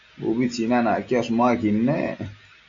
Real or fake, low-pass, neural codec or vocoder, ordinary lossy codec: real; 7.2 kHz; none; AAC, 64 kbps